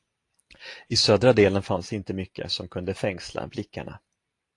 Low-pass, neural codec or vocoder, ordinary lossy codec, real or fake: 10.8 kHz; none; AAC, 48 kbps; real